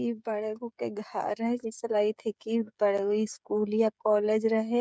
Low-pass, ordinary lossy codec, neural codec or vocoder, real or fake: none; none; codec, 16 kHz, 16 kbps, FreqCodec, smaller model; fake